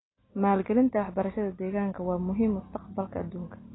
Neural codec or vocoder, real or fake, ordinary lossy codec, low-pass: none; real; AAC, 16 kbps; 7.2 kHz